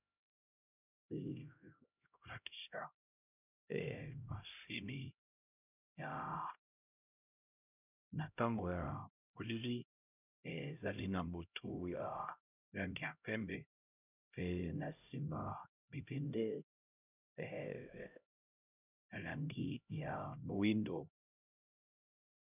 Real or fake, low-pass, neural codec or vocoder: fake; 3.6 kHz; codec, 16 kHz, 0.5 kbps, X-Codec, HuBERT features, trained on LibriSpeech